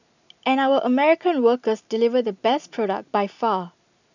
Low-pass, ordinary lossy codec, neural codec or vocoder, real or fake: 7.2 kHz; none; none; real